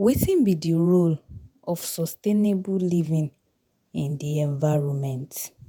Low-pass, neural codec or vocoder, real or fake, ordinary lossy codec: none; vocoder, 48 kHz, 128 mel bands, Vocos; fake; none